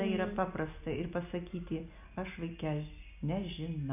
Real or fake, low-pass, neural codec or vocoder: real; 3.6 kHz; none